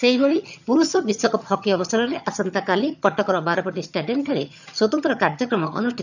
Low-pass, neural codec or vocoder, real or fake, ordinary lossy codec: 7.2 kHz; vocoder, 22.05 kHz, 80 mel bands, HiFi-GAN; fake; none